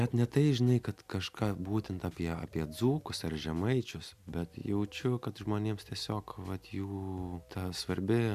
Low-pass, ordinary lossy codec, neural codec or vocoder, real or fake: 14.4 kHz; MP3, 96 kbps; none; real